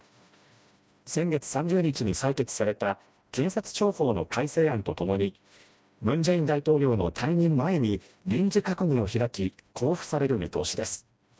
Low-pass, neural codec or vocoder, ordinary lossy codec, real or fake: none; codec, 16 kHz, 1 kbps, FreqCodec, smaller model; none; fake